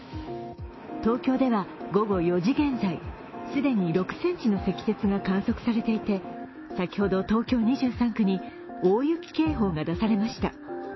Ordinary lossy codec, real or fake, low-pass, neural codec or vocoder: MP3, 24 kbps; fake; 7.2 kHz; autoencoder, 48 kHz, 128 numbers a frame, DAC-VAE, trained on Japanese speech